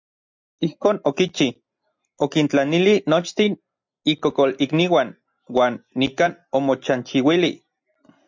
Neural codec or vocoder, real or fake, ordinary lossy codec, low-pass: none; real; MP3, 64 kbps; 7.2 kHz